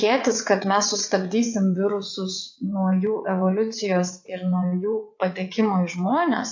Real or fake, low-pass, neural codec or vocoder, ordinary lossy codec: fake; 7.2 kHz; vocoder, 22.05 kHz, 80 mel bands, WaveNeXt; MP3, 48 kbps